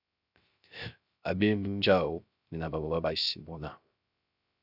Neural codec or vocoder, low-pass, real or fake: codec, 16 kHz, 0.3 kbps, FocalCodec; 5.4 kHz; fake